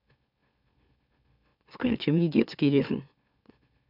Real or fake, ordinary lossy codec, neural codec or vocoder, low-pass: fake; none; autoencoder, 44.1 kHz, a latent of 192 numbers a frame, MeloTTS; 5.4 kHz